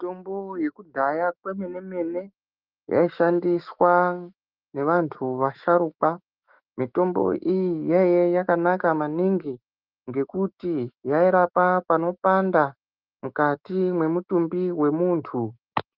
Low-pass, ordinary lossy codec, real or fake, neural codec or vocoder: 5.4 kHz; Opus, 32 kbps; fake; autoencoder, 48 kHz, 128 numbers a frame, DAC-VAE, trained on Japanese speech